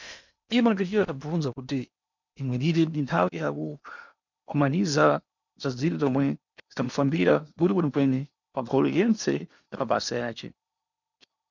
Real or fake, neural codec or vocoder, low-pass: fake; codec, 16 kHz in and 24 kHz out, 0.8 kbps, FocalCodec, streaming, 65536 codes; 7.2 kHz